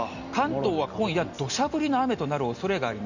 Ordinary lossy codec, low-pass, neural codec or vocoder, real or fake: none; 7.2 kHz; none; real